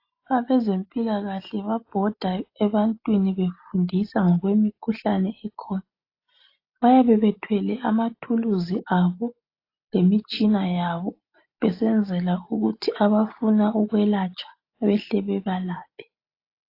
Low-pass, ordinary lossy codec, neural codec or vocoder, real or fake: 5.4 kHz; AAC, 32 kbps; none; real